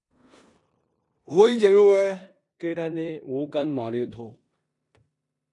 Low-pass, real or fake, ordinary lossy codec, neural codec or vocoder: 10.8 kHz; fake; AAC, 48 kbps; codec, 16 kHz in and 24 kHz out, 0.9 kbps, LongCat-Audio-Codec, four codebook decoder